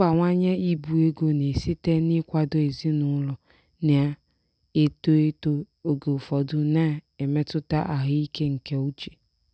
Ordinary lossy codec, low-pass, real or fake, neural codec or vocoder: none; none; real; none